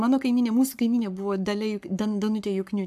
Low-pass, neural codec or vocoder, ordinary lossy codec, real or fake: 14.4 kHz; codec, 44.1 kHz, 7.8 kbps, Pupu-Codec; AAC, 96 kbps; fake